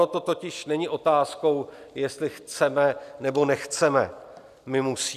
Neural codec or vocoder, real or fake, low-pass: none; real; 14.4 kHz